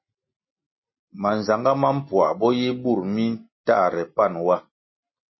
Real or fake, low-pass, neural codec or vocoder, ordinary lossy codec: real; 7.2 kHz; none; MP3, 24 kbps